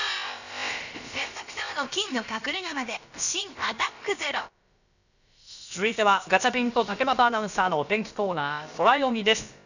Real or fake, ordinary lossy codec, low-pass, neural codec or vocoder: fake; none; 7.2 kHz; codec, 16 kHz, about 1 kbps, DyCAST, with the encoder's durations